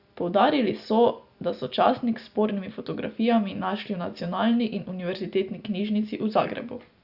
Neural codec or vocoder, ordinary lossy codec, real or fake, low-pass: none; Opus, 64 kbps; real; 5.4 kHz